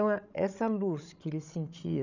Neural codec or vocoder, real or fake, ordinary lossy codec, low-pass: codec, 16 kHz, 16 kbps, FreqCodec, larger model; fake; none; 7.2 kHz